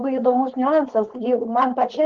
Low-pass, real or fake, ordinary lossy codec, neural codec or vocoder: 7.2 kHz; fake; Opus, 16 kbps; codec, 16 kHz, 4.8 kbps, FACodec